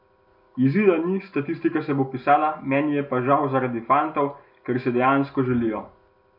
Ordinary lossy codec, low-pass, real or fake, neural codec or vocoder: none; 5.4 kHz; real; none